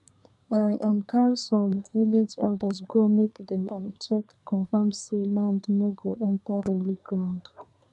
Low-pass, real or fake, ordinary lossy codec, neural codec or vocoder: 10.8 kHz; fake; none; codec, 24 kHz, 1 kbps, SNAC